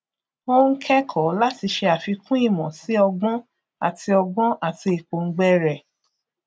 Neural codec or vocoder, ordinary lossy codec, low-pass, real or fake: none; none; none; real